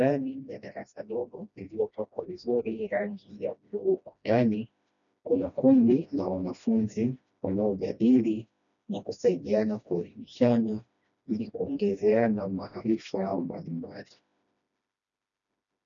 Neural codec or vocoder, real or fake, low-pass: codec, 16 kHz, 1 kbps, FreqCodec, smaller model; fake; 7.2 kHz